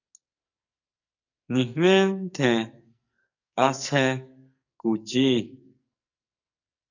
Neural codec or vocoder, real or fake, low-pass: codec, 44.1 kHz, 2.6 kbps, SNAC; fake; 7.2 kHz